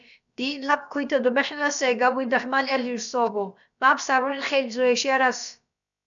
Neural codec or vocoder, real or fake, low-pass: codec, 16 kHz, about 1 kbps, DyCAST, with the encoder's durations; fake; 7.2 kHz